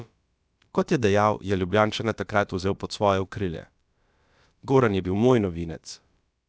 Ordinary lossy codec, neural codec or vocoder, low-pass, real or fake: none; codec, 16 kHz, about 1 kbps, DyCAST, with the encoder's durations; none; fake